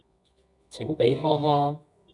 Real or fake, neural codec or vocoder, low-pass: fake; codec, 24 kHz, 0.9 kbps, WavTokenizer, medium music audio release; 10.8 kHz